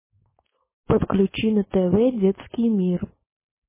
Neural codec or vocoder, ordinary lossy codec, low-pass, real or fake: codec, 16 kHz, 4.8 kbps, FACodec; MP3, 16 kbps; 3.6 kHz; fake